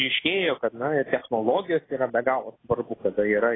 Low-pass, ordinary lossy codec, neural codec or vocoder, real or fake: 7.2 kHz; AAC, 16 kbps; none; real